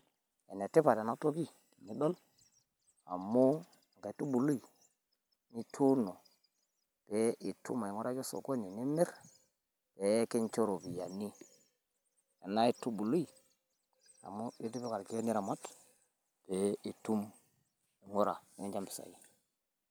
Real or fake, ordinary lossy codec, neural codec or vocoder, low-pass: real; none; none; none